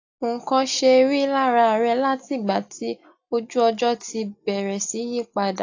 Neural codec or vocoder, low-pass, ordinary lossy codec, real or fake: none; 7.2 kHz; AAC, 48 kbps; real